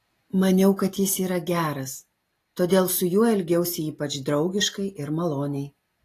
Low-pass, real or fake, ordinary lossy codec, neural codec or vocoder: 14.4 kHz; real; AAC, 48 kbps; none